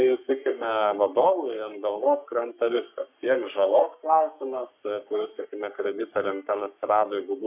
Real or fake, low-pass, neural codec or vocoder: fake; 3.6 kHz; codec, 44.1 kHz, 3.4 kbps, Pupu-Codec